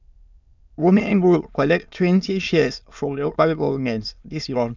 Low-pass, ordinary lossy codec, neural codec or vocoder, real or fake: 7.2 kHz; none; autoencoder, 22.05 kHz, a latent of 192 numbers a frame, VITS, trained on many speakers; fake